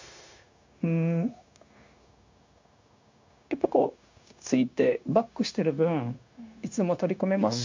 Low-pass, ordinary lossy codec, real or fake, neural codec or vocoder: 7.2 kHz; MP3, 48 kbps; fake; codec, 16 kHz, 0.9 kbps, LongCat-Audio-Codec